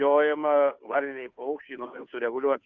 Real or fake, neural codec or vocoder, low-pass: fake; codec, 16 kHz, 2 kbps, FunCodec, trained on Chinese and English, 25 frames a second; 7.2 kHz